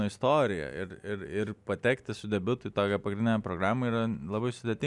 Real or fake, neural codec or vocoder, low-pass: real; none; 10.8 kHz